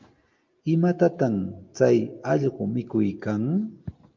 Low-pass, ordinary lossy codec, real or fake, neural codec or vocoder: 7.2 kHz; Opus, 24 kbps; real; none